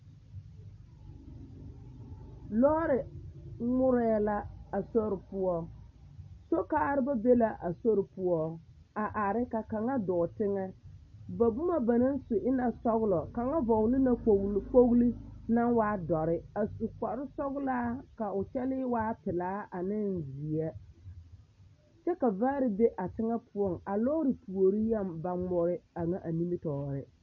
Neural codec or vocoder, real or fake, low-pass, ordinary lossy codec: none; real; 7.2 kHz; MP3, 32 kbps